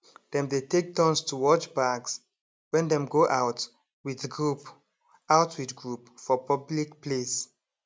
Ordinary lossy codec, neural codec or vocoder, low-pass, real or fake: none; none; none; real